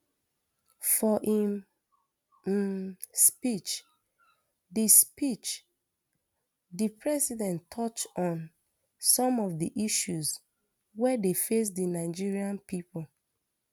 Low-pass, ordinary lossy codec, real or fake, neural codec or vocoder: 19.8 kHz; none; real; none